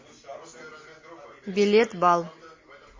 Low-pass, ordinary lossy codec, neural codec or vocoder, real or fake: 7.2 kHz; MP3, 32 kbps; none; real